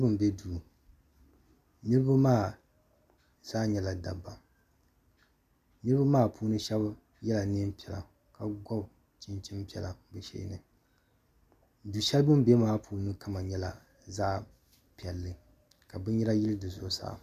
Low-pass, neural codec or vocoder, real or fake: 14.4 kHz; none; real